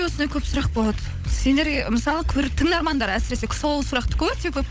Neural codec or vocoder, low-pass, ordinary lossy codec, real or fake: codec, 16 kHz, 16 kbps, FunCodec, trained on LibriTTS, 50 frames a second; none; none; fake